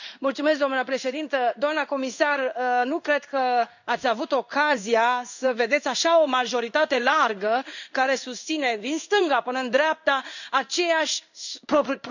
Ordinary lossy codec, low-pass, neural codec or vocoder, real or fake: none; 7.2 kHz; codec, 16 kHz in and 24 kHz out, 1 kbps, XY-Tokenizer; fake